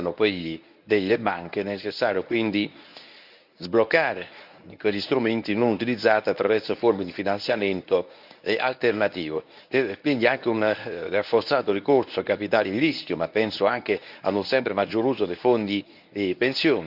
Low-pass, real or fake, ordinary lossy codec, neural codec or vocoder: 5.4 kHz; fake; Opus, 64 kbps; codec, 24 kHz, 0.9 kbps, WavTokenizer, medium speech release version 1